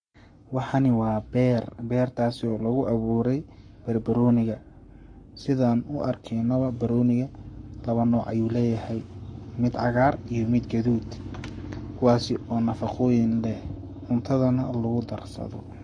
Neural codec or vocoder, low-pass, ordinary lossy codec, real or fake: codec, 44.1 kHz, 7.8 kbps, Pupu-Codec; 9.9 kHz; MP3, 48 kbps; fake